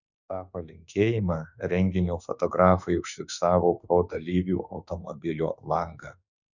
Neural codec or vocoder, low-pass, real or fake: autoencoder, 48 kHz, 32 numbers a frame, DAC-VAE, trained on Japanese speech; 7.2 kHz; fake